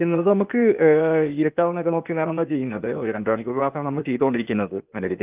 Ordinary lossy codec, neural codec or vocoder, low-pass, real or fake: Opus, 24 kbps; codec, 16 kHz, 0.8 kbps, ZipCodec; 3.6 kHz; fake